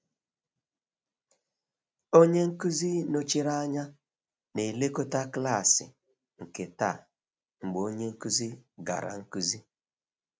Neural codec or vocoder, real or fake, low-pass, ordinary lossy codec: none; real; none; none